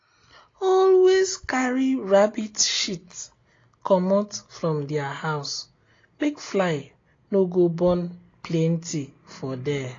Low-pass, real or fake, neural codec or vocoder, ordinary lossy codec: 7.2 kHz; real; none; AAC, 32 kbps